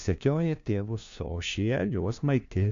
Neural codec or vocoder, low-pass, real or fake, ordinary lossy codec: codec, 16 kHz, 1 kbps, FunCodec, trained on LibriTTS, 50 frames a second; 7.2 kHz; fake; AAC, 64 kbps